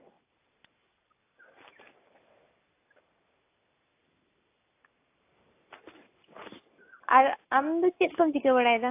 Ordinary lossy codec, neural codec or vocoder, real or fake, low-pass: AAC, 24 kbps; none; real; 3.6 kHz